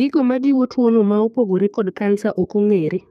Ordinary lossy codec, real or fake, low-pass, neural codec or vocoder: none; fake; 14.4 kHz; codec, 32 kHz, 1.9 kbps, SNAC